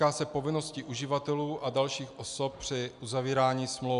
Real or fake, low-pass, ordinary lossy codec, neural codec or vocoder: real; 10.8 kHz; AAC, 96 kbps; none